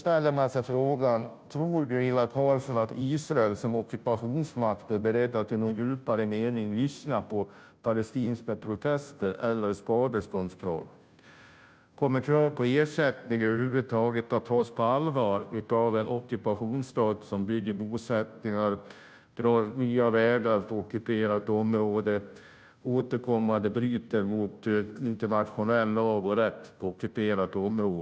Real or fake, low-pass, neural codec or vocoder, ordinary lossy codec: fake; none; codec, 16 kHz, 0.5 kbps, FunCodec, trained on Chinese and English, 25 frames a second; none